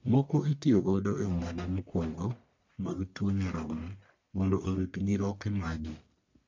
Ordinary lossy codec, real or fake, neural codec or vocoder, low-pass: MP3, 64 kbps; fake; codec, 44.1 kHz, 1.7 kbps, Pupu-Codec; 7.2 kHz